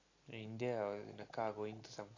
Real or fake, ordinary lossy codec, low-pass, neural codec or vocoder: real; AAC, 32 kbps; 7.2 kHz; none